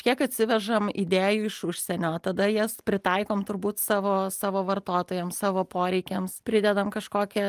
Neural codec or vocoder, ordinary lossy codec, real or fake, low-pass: none; Opus, 32 kbps; real; 14.4 kHz